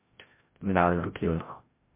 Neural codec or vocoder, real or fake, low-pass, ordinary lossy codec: codec, 16 kHz, 0.5 kbps, FreqCodec, larger model; fake; 3.6 kHz; MP3, 24 kbps